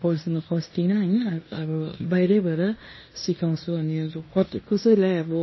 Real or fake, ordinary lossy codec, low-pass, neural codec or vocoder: fake; MP3, 24 kbps; 7.2 kHz; codec, 16 kHz in and 24 kHz out, 0.9 kbps, LongCat-Audio-Codec, fine tuned four codebook decoder